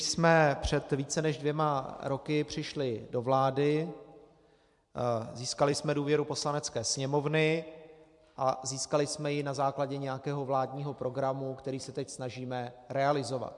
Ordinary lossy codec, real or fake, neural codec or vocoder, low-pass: MP3, 64 kbps; fake; vocoder, 44.1 kHz, 128 mel bands every 256 samples, BigVGAN v2; 10.8 kHz